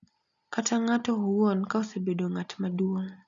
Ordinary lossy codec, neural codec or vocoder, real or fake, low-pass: none; none; real; 7.2 kHz